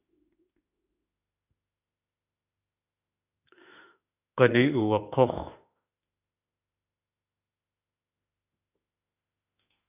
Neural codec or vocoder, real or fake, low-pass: autoencoder, 48 kHz, 32 numbers a frame, DAC-VAE, trained on Japanese speech; fake; 3.6 kHz